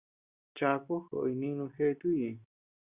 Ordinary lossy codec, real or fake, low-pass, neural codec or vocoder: Opus, 64 kbps; real; 3.6 kHz; none